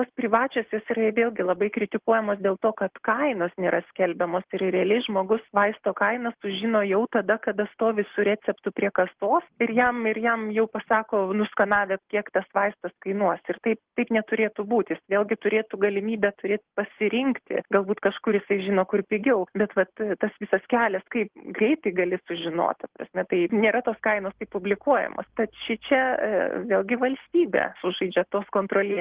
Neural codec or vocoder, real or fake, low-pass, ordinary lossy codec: none; real; 3.6 kHz; Opus, 16 kbps